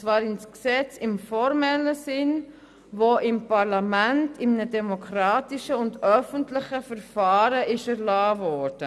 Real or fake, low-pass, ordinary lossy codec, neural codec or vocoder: real; none; none; none